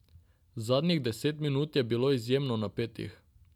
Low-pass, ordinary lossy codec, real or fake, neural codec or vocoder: 19.8 kHz; none; real; none